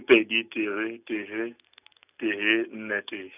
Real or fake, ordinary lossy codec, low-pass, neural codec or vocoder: real; none; 3.6 kHz; none